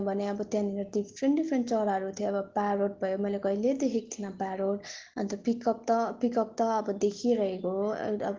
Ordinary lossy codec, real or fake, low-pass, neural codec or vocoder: Opus, 16 kbps; real; 7.2 kHz; none